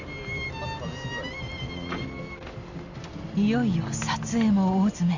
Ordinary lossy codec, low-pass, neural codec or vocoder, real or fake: none; 7.2 kHz; none; real